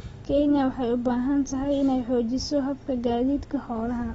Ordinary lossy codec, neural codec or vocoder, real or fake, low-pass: AAC, 24 kbps; none; real; 19.8 kHz